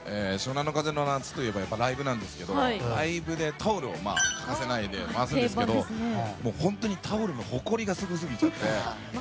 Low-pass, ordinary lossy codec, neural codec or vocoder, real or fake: none; none; none; real